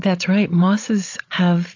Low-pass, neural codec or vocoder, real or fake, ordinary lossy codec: 7.2 kHz; codec, 16 kHz, 8 kbps, FreqCodec, larger model; fake; AAC, 48 kbps